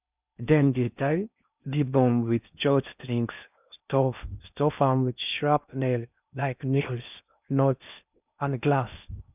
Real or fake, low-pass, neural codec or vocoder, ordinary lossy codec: fake; 3.6 kHz; codec, 16 kHz in and 24 kHz out, 0.6 kbps, FocalCodec, streaming, 4096 codes; none